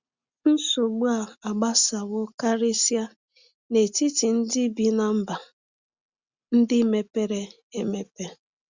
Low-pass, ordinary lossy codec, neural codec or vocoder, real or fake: 7.2 kHz; Opus, 64 kbps; none; real